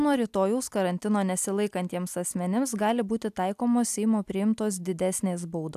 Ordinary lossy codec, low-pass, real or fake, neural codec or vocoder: AAC, 96 kbps; 14.4 kHz; real; none